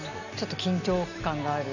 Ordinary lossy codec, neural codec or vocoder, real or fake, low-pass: none; none; real; 7.2 kHz